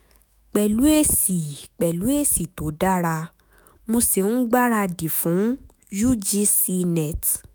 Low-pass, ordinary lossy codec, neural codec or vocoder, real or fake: none; none; autoencoder, 48 kHz, 128 numbers a frame, DAC-VAE, trained on Japanese speech; fake